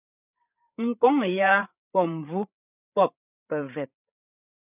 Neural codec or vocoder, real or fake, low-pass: codec, 16 kHz, 8 kbps, FreqCodec, larger model; fake; 3.6 kHz